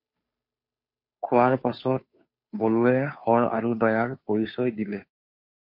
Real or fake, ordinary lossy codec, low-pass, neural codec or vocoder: fake; MP3, 32 kbps; 5.4 kHz; codec, 16 kHz, 2 kbps, FunCodec, trained on Chinese and English, 25 frames a second